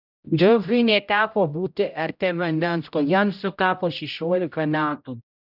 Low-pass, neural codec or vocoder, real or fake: 5.4 kHz; codec, 16 kHz, 0.5 kbps, X-Codec, HuBERT features, trained on general audio; fake